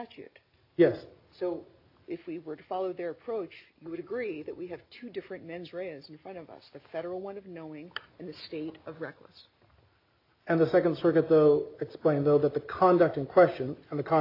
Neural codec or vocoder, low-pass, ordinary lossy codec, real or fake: none; 5.4 kHz; AAC, 32 kbps; real